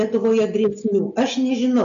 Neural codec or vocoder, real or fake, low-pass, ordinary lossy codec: none; real; 7.2 kHz; MP3, 64 kbps